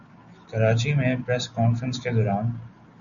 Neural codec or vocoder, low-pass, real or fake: none; 7.2 kHz; real